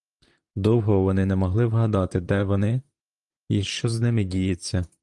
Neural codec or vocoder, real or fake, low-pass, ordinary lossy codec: vocoder, 44.1 kHz, 128 mel bands, Pupu-Vocoder; fake; 10.8 kHz; Opus, 32 kbps